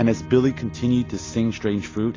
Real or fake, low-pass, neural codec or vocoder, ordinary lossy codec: real; 7.2 kHz; none; AAC, 32 kbps